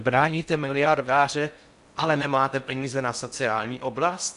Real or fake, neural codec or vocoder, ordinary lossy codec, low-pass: fake; codec, 16 kHz in and 24 kHz out, 0.6 kbps, FocalCodec, streaming, 4096 codes; AAC, 96 kbps; 10.8 kHz